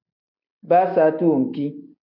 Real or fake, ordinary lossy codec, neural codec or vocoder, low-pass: real; AAC, 48 kbps; none; 5.4 kHz